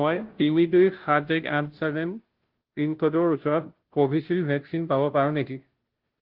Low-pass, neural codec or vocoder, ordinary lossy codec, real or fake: 5.4 kHz; codec, 16 kHz, 0.5 kbps, FunCodec, trained on Chinese and English, 25 frames a second; Opus, 16 kbps; fake